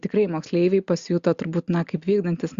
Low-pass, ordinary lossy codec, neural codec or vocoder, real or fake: 7.2 kHz; Opus, 64 kbps; none; real